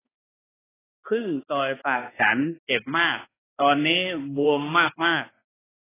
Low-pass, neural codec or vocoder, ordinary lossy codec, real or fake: 3.6 kHz; codec, 16 kHz, 2 kbps, X-Codec, HuBERT features, trained on balanced general audio; AAC, 16 kbps; fake